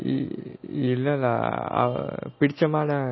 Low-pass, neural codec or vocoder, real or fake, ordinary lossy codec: 7.2 kHz; none; real; MP3, 24 kbps